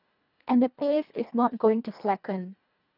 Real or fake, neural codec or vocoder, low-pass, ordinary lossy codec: fake; codec, 24 kHz, 1.5 kbps, HILCodec; 5.4 kHz; none